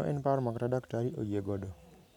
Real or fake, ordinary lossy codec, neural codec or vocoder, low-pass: real; none; none; 19.8 kHz